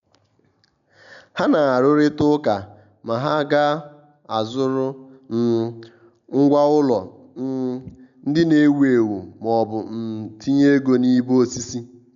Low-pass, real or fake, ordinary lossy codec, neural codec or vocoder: 7.2 kHz; real; none; none